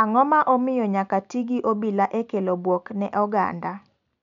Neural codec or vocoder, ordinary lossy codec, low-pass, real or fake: none; none; 7.2 kHz; real